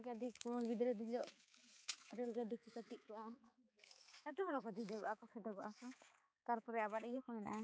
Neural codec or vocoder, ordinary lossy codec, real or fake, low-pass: codec, 16 kHz, 4 kbps, X-Codec, HuBERT features, trained on balanced general audio; none; fake; none